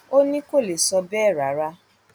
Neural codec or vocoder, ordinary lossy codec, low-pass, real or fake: none; none; none; real